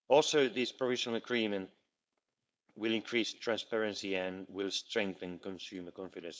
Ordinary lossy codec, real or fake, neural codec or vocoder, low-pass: none; fake; codec, 16 kHz, 4.8 kbps, FACodec; none